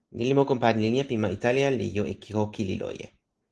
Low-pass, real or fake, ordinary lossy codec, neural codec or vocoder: 10.8 kHz; real; Opus, 16 kbps; none